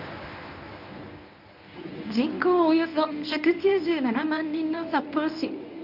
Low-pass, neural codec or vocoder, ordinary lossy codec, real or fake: 5.4 kHz; codec, 24 kHz, 0.9 kbps, WavTokenizer, medium speech release version 1; none; fake